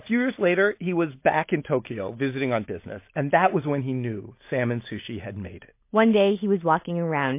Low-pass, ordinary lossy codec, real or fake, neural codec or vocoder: 3.6 kHz; MP3, 24 kbps; real; none